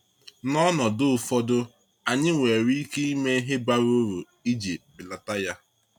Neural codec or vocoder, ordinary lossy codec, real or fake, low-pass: none; none; real; 19.8 kHz